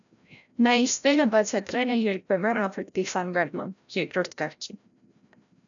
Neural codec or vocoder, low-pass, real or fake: codec, 16 kHz, 0.5 kbps, FreqCodec, larger model; 7.2 kHz; fake